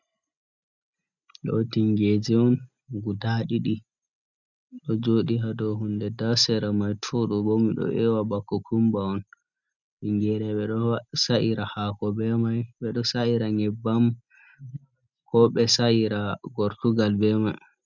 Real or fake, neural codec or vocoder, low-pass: real; none; 7.2 kHz